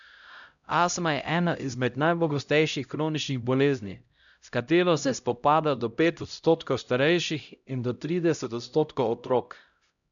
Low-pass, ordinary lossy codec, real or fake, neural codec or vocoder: 7.2 kHz; none; fake; codec, 16 kHz, 0.5 kbps, X-Codec, HuBERT features, trained on LibriSpeech